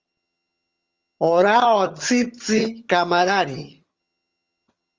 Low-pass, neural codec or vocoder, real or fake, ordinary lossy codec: 7.2 kHz; vocoder, 22.05 kHz, 80 mel bands, HiFi-GAN; fake; Opus, 32 kbps